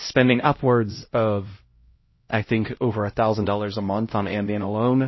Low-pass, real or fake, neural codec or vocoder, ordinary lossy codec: 7.2 kHz; fake; codec, 16 kHz, 0.5 kbps, X-Codec, HuBERT features, trained on LibriSpeech; MP3, 24 kbps